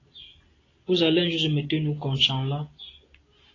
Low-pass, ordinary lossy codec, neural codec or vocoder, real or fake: 7.2 kHz; AAC, 32 kbps; none; real